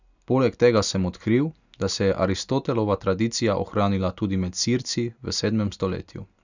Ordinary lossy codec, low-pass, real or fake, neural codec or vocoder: none; 7.2 kHz; real; none